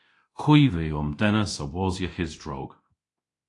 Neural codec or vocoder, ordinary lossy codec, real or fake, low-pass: codec, 24 kHz, 0.9 kbps, DualCodec; AAC, 48 kbps; fake; 10.8 kHz